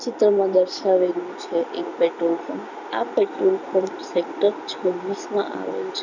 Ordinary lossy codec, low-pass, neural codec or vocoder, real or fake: none; 7.2 kHz; none; real